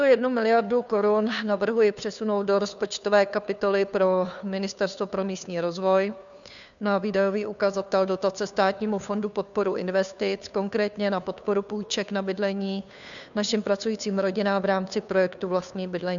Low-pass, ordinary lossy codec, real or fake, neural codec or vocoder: 7.2 kHz; MP3, 96 kbps; fake; codec, 16 kHz, 2 kbps, FunCodec, trained on Chinese and English, 25 frames a second